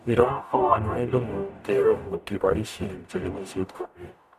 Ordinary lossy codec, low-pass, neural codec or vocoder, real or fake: none; 14.4 kHz; codec, 44.1 kHz, 0.9 kbps, DAC; fake